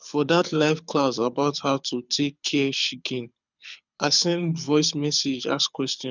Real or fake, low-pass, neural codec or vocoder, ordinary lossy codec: fake; 7.2 kHz; codec, 24 kHz, 6 kbps, HILCodec; none